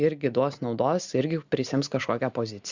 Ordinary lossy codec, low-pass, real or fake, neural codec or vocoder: Opus, 64 kbps; 7.2 kHz; real; none